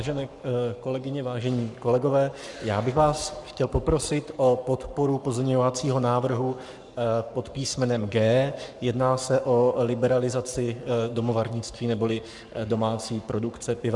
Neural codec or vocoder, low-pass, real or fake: codec, 44.1 kHz, 7.8 kbps, Pupu-Codec; 10.8 kHz; fake